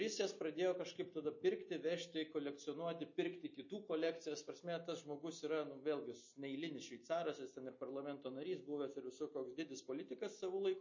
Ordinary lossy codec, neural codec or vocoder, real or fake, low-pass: MP3, 32 kbps; none; real; 7.2 kHz